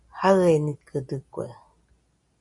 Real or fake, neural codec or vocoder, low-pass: real; none; 10.8 kHz